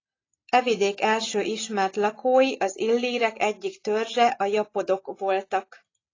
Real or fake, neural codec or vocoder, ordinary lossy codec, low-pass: real; none; AAC, 32 kbps; 7.2 kHz